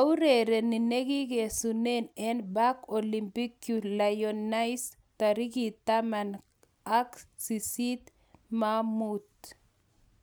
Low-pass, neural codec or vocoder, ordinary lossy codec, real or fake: none; none; none; real